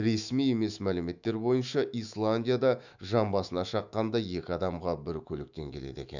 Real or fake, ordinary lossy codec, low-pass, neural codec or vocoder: fake; none; 7.2 kHz; autoencoder, 48 kHz, 128 numbers a frame, DAC-VAE, trained on Japanese speech